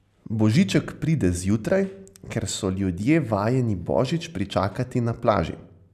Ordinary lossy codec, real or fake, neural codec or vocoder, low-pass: none; real; none; 14.4 kHz